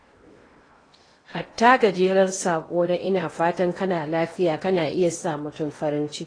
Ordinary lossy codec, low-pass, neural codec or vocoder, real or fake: AAC, 32 kbps; 9.9 kHz; codec, 16 kHz in and 24 kHz out, 0.8 kbps, FocalCodec, streaming, 65536 codes; fake